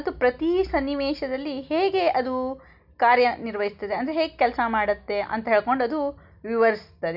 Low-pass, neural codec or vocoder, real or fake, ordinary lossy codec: 5.4 kHz; none; real; none